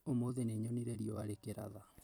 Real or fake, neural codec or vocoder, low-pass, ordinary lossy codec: fake; vocoder, 44.1 kHz, 128 mel bands every 256 samples, BigVGAN v2; none; none